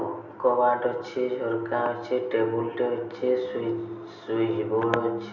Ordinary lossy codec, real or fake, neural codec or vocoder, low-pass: none; real; none; 7.2 kHz